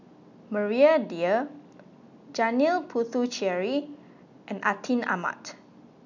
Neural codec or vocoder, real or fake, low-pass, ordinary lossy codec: none; real; 7.2 kHz; none